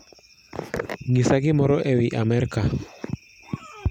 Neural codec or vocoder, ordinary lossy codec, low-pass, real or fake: none; none; 19.8 kHz; real